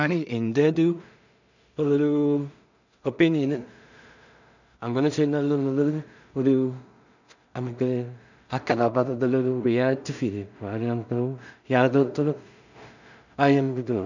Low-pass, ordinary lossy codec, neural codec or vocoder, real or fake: 7.2 kHz; none; codec, 16 kHz in and 24 kHz out, 0.4 kbps, LongCat-Audio-Codec, two codebook decoder; fake